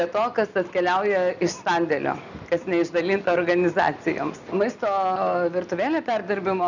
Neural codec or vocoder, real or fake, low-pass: none; real; 7.2 kHz